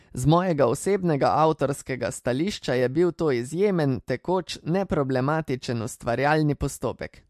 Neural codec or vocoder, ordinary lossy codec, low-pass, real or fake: none; MP3, 64 kbps; 14.4 kHz; real